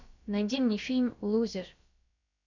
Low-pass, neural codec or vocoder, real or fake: 7.2 kHz; codec, 16 kHz, about 1 kbps, DyCAST, with the encoder's durations; fake